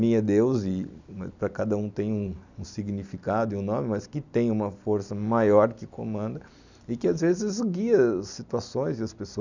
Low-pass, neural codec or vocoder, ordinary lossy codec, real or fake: 7.2 kHz; none; none; real